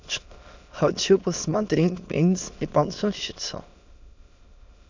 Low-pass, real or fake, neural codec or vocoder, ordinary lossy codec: 7.2 kHz; fake; autoencoder, 22.05 kHz, a latent of 192 numbers a frame, VITS, trained on many speakers; MP3, 64 kbps